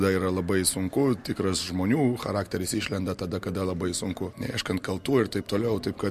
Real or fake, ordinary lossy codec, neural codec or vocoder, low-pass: real; MP3, 64 kbps; none; 14.4 kHz